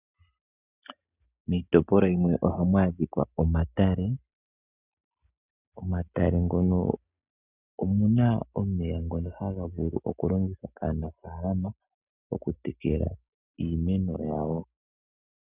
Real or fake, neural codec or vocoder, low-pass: real; none; 3.6 kHz